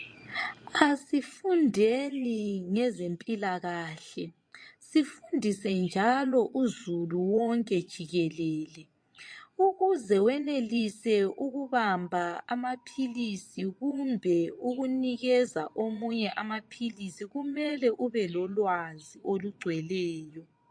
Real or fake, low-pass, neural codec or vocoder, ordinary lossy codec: fake; 9.9 kHz; vocoder, 22.05 kHz, 80 mel bands, Vocos; MP3, 48 kbps